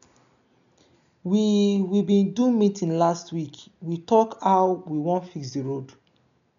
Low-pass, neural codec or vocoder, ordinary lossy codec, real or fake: 7.2 kHz; none; none; real